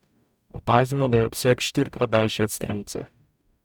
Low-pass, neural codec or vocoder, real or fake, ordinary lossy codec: 19.8 kHz; codec, 44.1 kHz, 0.9 kbps, DAC; fake; none